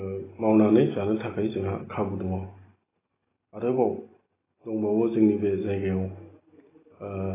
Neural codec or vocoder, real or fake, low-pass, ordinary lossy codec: none; real; 3.6 kHz; AAC, 16 kbps